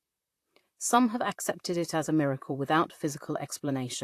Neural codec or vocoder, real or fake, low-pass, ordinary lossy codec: vocoder, 44.1 kHz, 128 mel bands, Pupu-Vocoder; fake; 14.4 kHz; AAC, 64 kbps